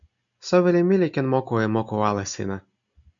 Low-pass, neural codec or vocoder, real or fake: 7.2 kHz; none; real